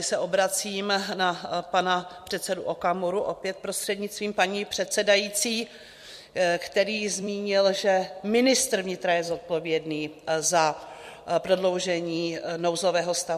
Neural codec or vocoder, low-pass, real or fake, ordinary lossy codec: vocoder, 44.1 kHz, 128 mel bands every 256 samples, BigVGAN v2; 14.4 kHz; fake; MP3, 64 kbps